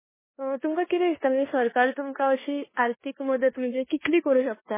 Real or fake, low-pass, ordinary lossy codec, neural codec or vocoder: fake; 3.6 kHz; MP3, 16 kbps; codec, 16 kHz in and 24 kHz out, 0.9 kbps, LongCat-Audio-Codec, four codebook decoder